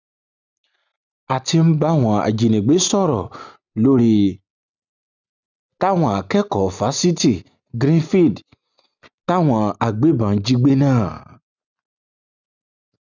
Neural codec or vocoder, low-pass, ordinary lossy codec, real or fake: none; 7.2 kHz; none; real